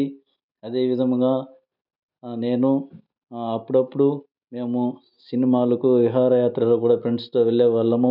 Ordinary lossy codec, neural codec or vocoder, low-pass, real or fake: none; none; 5.4 kHz; real